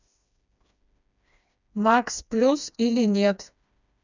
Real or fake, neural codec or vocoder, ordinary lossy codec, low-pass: fake; codec, 16 kHz, 2 kbps, FreqCodec, smaller model; none; 7.2 kHz